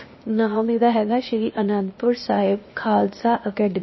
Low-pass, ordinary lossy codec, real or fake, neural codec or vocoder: 7.2 kHz; MP3, 24 kbps; fake; codec, 16 kHz, 0.8 kbps, ZipCodec